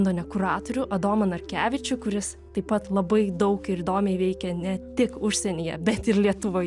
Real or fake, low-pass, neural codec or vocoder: real; 10.8 kHz; none